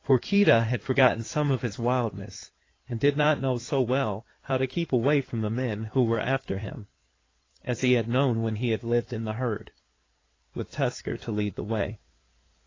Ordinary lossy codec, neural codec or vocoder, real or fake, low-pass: AAC, 32 kbps; codec, 16 kHz in and 24 kHz out, 2.2 kbps, FireRedTTS-2 codec; fake; 7.2 kHz